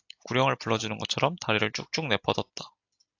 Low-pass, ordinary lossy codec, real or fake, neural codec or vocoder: 7.2 kHz; AAC, 48 kbps; real; none